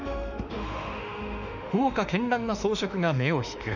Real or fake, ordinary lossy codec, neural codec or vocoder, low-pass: fake; none; autoencoder, 48 kHz, 32 numbers a frame, DAC-VAE, trained on Japanese speech; 7.2 kHz